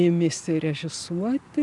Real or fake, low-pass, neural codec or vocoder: real; 10.8 kHz; none